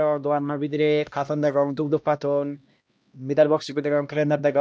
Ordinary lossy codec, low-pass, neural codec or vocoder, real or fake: none; none; codec, 16 kHz, 1 kbps, X-Codec, HuBERT features, trained on LibriSpeech; fake